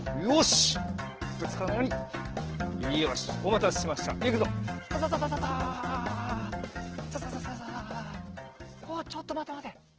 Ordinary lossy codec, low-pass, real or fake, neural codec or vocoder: Opus, 16 kbps; 7.2 kHz; real; none